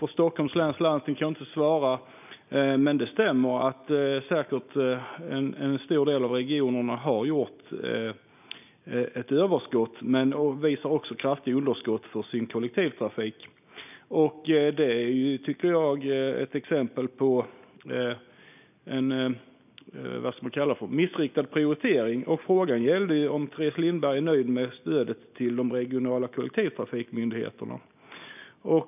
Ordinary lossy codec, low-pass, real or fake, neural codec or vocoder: none; 3.6 kHz; real; none